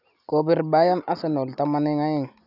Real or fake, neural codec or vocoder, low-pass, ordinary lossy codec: real; none; 5.4 kHz; none